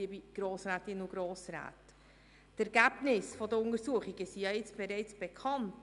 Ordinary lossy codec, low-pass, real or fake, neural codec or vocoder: none; 10.8 kHz; real; none